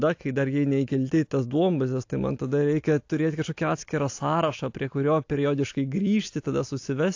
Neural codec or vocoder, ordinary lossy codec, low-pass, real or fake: none; AAC, 48 kbps; 7.2 kHz; real